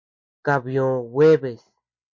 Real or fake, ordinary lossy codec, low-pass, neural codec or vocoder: real; MP3, 48 kbps; 7.2 kHz; none